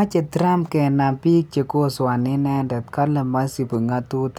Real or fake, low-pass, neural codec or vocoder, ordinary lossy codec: real; none; none; none